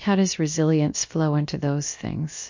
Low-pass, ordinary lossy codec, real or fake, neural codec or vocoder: 7.2 kHz; MP3, 48 kbps; fake; codec, 16 kHz, about 1 kbps, DyCAST, with the encoder's durations